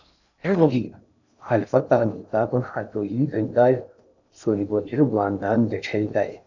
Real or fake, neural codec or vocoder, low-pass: fake; codec, 16 kHz in and 24 kHz out, 0.6 kbps, FocalCodec, streaming, 2048 codes; 7.2 kHz